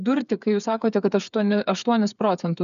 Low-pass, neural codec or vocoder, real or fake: 7.2 kHz; codec, 16 kHz, 8 kbps, FreqCodec, smaller model; fake